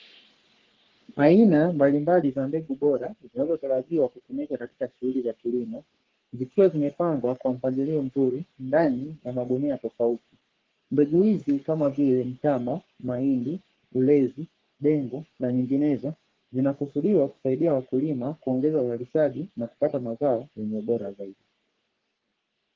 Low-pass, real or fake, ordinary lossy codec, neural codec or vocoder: 7.2 kHz; fake; Opus, 16 kbps; codec, 44.1 kHz, 3.4 kbps, Pupu-Codec